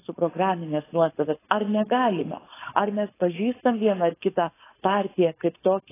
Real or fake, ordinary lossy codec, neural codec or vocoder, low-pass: fake; AAC, 16 kbps; codec, 16 kHz, 4.8 kbps, FACodec; 3.6 kHz